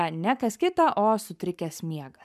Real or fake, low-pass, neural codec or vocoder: fake; 14.4 kHz; codec, 44.1 kHz, 7.8 kbps, Pupu-Codec